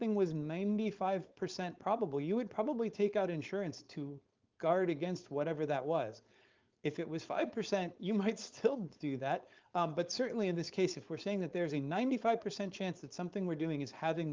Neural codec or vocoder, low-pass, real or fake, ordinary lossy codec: codec, 16 kHz, 4.8 kbps, FACodec; 7.2 kHz; fake; Opus, 32 kbps